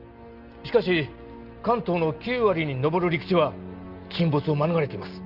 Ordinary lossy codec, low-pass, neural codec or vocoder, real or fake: Opus, 24 kbps; 5.4 kHz; none; real